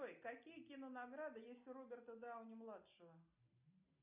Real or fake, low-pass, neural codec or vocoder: real; 3.6 kHz; none